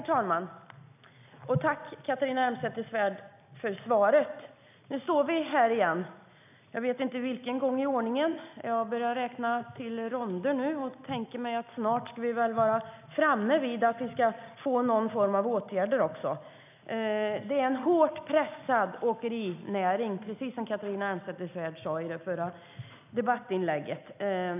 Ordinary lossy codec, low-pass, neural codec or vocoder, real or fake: none; 3.6 kHz; none; real